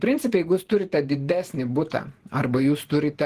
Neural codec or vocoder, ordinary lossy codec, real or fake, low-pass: vocoder, 48 kHz, 128 mel bands, Vocos; Opus, 16 kbps; fake; 14.4 kHz